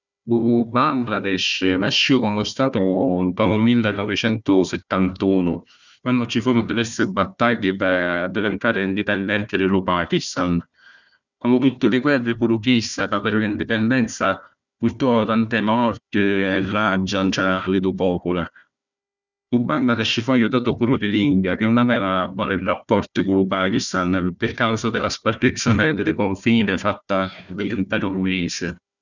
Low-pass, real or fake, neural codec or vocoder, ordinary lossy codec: 7.2 kHz; fake; codec, 16 kHz, 1 kbps, FunCodec, trained on Chinese and English, 50 frames a second; none